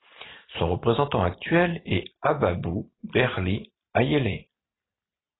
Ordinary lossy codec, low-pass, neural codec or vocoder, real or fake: AAC, 16 kbps; 7.2 kHz; none; real